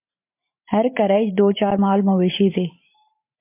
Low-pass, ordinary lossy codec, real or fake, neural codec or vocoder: 3.6 kHz; MP3, 32 kbps; fake; vocoder, 44.1 kHz, 128 mel bands every 512 samples, BigVGAN v2